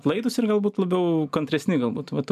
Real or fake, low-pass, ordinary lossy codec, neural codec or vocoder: real; 14.4 kHz; AAC, 96 kbps; none